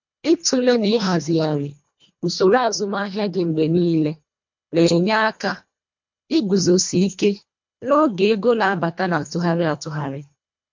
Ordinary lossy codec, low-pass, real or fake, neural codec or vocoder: MP3, 48 kbps; 7.2 kHz; fake; codec, 24 kHz, 1.5 kbps, HILCodec